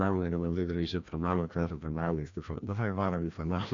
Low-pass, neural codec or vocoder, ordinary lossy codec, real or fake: 7.2 kHz; codec, 16 kHz, 1 kbps, FreqCodec, larger model; AAC, 64 kbps; fake